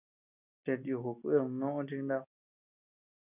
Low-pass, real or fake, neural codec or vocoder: 3.6 kHz; real; none